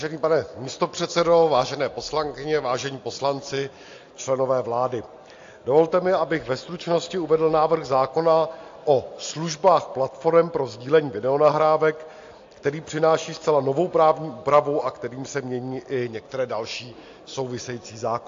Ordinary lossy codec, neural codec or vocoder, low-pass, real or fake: AAC, 48 kbps; none; 7.2 kHz; real